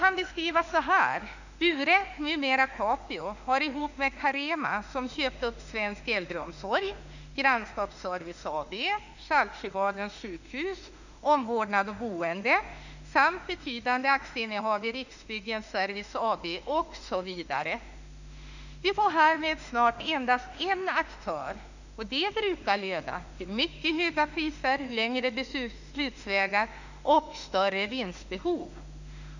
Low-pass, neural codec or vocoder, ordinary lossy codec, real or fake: 7.2 kHz; autoencoder, 48 kHz, 32 numbers a frame, DAC-VAE, trained on Japanese speech; none; fake